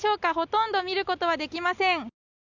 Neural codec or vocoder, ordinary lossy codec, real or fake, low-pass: none; none; real; 7.2 kHz